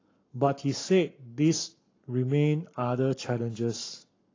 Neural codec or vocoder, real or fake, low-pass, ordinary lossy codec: codec, 44.1 kHz, 7.8 kbps, Pupu-Codec; fake; 7.2 kHz; AAC, 32 kbps